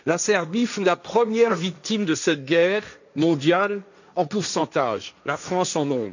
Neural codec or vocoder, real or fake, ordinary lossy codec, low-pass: codec, 16 kHz, 1.1 kbps, Voila-Tokenizer; fake; none; 7.2 kHz